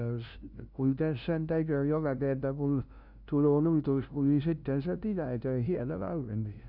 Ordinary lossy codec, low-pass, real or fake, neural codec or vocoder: none; 5.4 kHz; fake; codec, 16 kHz, 0.5 kbps, FunCodec, trained on LibriTTS, 25 frames a second